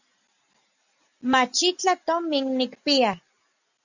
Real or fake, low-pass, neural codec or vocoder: real; 7.2 kHz; none